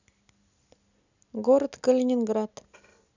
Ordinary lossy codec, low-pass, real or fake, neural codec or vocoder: none; 7.2 kHz; real; none